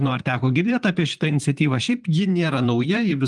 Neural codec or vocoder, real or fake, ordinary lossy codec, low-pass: vocoder, 44.1 kHz, 128 mel bands, Pupu-Vocoder; fake; Opus, 24 kbps; 10.8 kHz